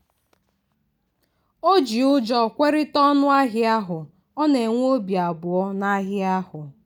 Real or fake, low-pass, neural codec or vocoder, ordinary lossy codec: real; 19.8 kHz; none; none